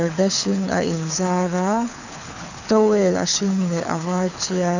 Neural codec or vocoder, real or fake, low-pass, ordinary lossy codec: codec, 24 kHz, 6 kbps, HILCodec; fake; 7.2 kHz; none